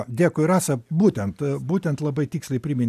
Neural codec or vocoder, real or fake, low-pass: none; real; 14.4 kHz